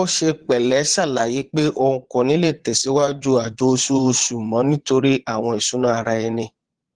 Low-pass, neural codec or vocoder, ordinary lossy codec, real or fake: 9.9 kHz; vocoder, 22.05 kHz, 80 mel bands, Vocos; Opus, 16 kbps; fake